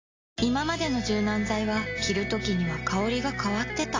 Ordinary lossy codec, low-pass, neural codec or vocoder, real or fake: AAC, 32 kbps; 7.2 kHz; none; real